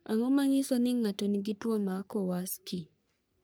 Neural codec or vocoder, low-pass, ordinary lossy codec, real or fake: codec, 44.1 kHz, 3.4 kbps, Pupu-Codec; none; none; fake